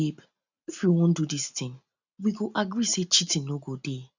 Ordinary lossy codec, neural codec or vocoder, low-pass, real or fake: none; none; 7.2 kHz; real